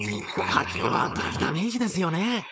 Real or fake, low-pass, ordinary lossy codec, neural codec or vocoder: fake; none; none; codec, 16 kHz, 4.8 kbps, FACodec